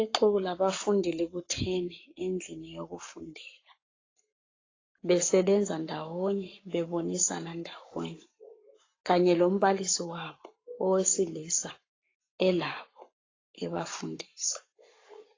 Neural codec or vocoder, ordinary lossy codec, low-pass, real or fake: codec, 44.1 kHz, 7.8 kbps, Pupu-Codec; AAC, 32 kbps; 7.2 kHz; fake